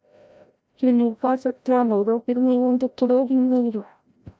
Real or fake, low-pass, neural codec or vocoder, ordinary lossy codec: fake; none; codec, 16 kHz, 0.5 kbps, FreqCodec, larger model; none